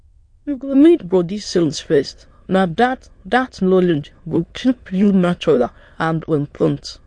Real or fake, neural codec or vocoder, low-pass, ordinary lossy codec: fake; autoencoder, 22.05 kHz, a latent of 192 numbers a frame, VITS, trained on many speakers; 9.9 kHz; MP3, 48 kbps